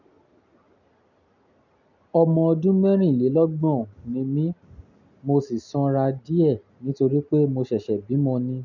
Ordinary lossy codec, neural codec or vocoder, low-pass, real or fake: none; none; 7.2 kHz; real